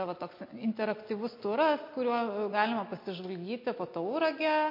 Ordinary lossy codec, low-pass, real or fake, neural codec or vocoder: MP3, 32 kbps; 5.4 kHz; real; none